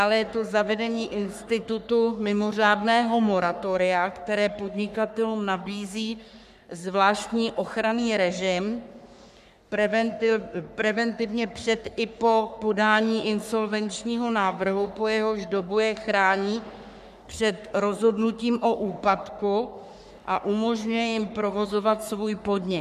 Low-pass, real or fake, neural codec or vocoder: 14.4 kHz; fake; codec, 44.1 kHz, 3.4 kbps, Pupu-Codec